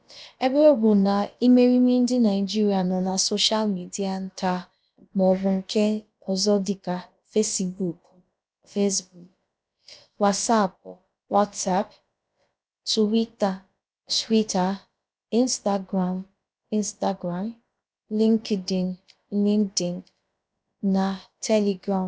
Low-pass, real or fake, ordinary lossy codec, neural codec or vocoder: none; fake; none; codec, 16 kHz, about 1 kbps, DyCAST, with the encoder's durations